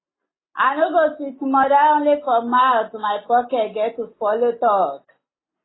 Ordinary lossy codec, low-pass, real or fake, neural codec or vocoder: AAC, 16 kbps; 7.2 kHz; real; none